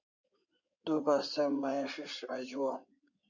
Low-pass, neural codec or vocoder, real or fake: 7.2 kHz; codec, 16 kHz in and 24 kHz out, 2.2 kbps, FireRedTTS-2 codec; fake